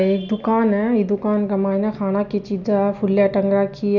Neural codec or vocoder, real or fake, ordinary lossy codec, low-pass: none; real; none; 7.2 kHz